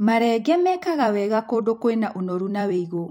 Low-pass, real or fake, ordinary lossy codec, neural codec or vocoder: 19.8 kHz; fake; MP3, 64 kbps; vocoder, 44.1 kHz, 128 mel bands every 256 samples, BigVGAN v2